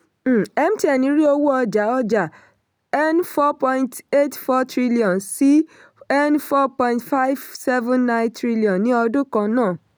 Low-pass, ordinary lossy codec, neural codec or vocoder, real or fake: none; none; none; real